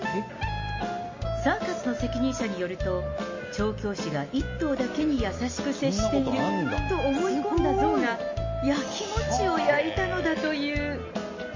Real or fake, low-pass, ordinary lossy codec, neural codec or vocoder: real; 7.2 kHz; MP3, 32 kbps; none